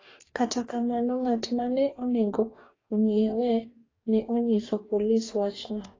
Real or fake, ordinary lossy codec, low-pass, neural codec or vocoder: fake; AAC, 32 kbps; 7.2 kHz; codec, 44.1 kHz, 2.6 kbps, DAC